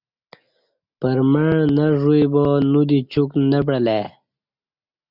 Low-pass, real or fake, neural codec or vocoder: 5.4 kHz; real; none